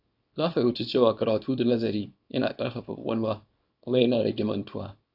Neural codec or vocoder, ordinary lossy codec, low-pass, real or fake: codec, 24 kHz, 0.9 kbps, WavTokenizer, small release; AAC, 48 kbps; 5.4 kHz; fake